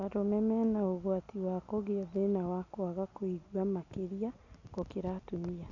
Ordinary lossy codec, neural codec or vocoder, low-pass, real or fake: none; none; 7.2 kHz; real